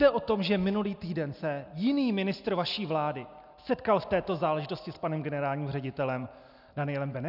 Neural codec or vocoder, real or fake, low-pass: none; real; 5.4 kHz